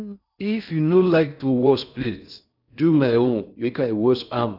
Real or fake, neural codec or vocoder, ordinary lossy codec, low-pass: fake; codec, 16 kHz in and 24 kHz out, 0.6 kbps, FocalCodec, streaming, 4096 codes; none; 5.4 kHz